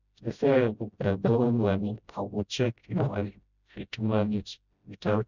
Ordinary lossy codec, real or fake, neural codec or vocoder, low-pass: none; fake; codec, 16 kHz, 0.5 kbps, FreqCodec, smaller model; 7.2 kHz